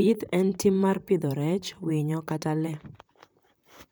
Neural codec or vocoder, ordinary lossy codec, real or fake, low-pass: vocoder, 44.1 kHz, 128 mel bands, Pupu-Vocoder; none; fake; none